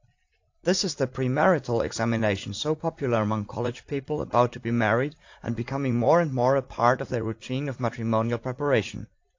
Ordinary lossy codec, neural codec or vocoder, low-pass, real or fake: AAC, 48 kbps; vocoder, 44.1 kHz, 80 mel bands, Vocos; 7.2 kHz; fake